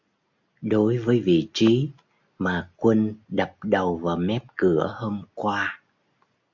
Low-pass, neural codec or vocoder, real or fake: 7.2 kHz; none; real